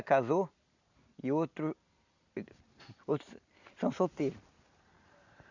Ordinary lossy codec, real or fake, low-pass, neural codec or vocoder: none; real; 7.2 kHz; none